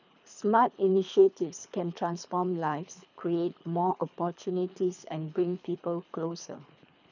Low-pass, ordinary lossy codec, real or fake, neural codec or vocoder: 7.2 kHz; none; fake; codec, 24 kHz, 3 kbps, HILCodec